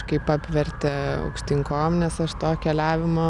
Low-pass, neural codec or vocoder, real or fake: 10.8 kHz; none; real